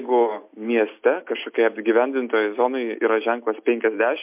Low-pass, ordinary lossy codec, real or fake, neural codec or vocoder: 3.6 kHz; MP3, 32 kbps; real; none